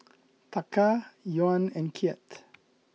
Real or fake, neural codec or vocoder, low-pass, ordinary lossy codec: real; none; none; none